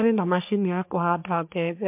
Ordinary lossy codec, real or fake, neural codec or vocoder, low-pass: MP3, 32 kbps; fake; codec, 44.1 kHz, 3.4 kbps, Pupu-Codec; 3.6 kHz